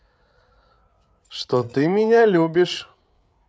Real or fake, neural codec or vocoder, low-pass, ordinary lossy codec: fake; codec, 16 kHz, 8 kbps, FreqCodec, larger model; none; none